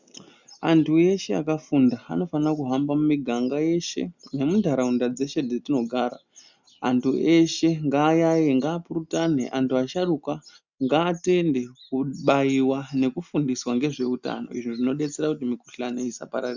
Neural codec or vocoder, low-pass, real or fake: none; 7.2 kHz; real